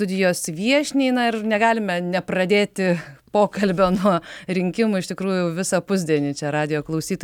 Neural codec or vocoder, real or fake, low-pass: none; real; 19.8 kHz